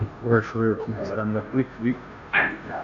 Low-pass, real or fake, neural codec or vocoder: 7.2 kHz; fake; codec, 16 kHz, 0.5 kbps, FunCodec, trained on Chinese and English, 25 frames a second